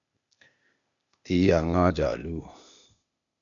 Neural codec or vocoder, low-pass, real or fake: codec, 16 kHz, 0.8 kbps, ZipCodec; 7.2 kHz; fake